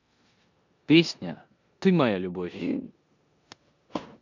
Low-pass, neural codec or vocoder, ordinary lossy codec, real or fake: 7.2 kHz; codec, 16 kHz in and 24 kHz out, 0.9 kbps, LongCat-Audio-Codec, four codebook decoder; none; fake